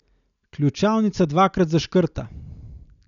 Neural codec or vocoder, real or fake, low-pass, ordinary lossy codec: none; real; 7.2 kHz; none